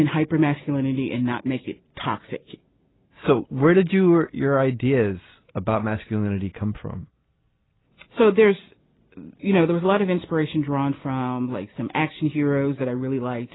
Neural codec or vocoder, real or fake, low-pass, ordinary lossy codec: none; real; 7.2 kHz; AAC, 16 kbps